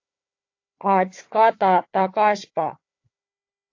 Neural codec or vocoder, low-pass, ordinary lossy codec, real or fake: codec, 16 kHz, 4 kbps, FunCodec, trained on Chinese and English, 50 frames a second; 7.2 kHz; AAC, 48 kbps; fake